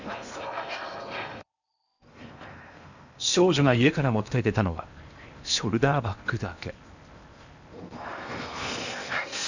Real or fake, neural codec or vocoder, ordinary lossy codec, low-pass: fake; codec, 16 kHz in and 24 kHz out, 0.8 kbps, FocalCodec, streaming, 65536 codes; none; 7.2 kHz